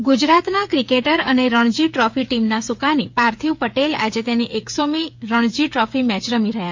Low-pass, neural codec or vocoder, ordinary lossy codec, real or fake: 7.2 kHz; codec, 16 kHz, 8 kbps, FreqCodec, smaller model; MP3, 48 kbps; fake